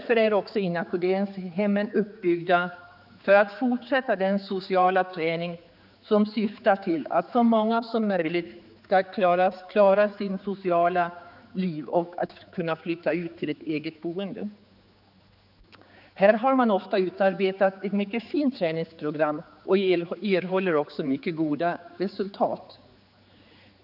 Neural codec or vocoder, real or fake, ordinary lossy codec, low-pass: codec, 16 kHz, 4 kbps, X-Codec, HuBERT features, trained on general audio; fake; none; 5.4 kHz